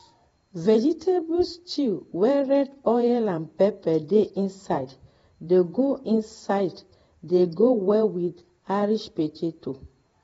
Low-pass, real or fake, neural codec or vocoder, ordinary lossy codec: 19.8 kHz; fake; vocoder, 48 kHz, 128 mel bands, Vocos; AAC, 24 kbps